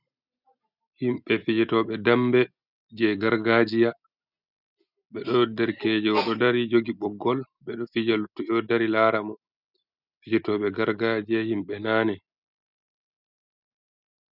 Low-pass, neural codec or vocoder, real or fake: 5.4 kHz; none; real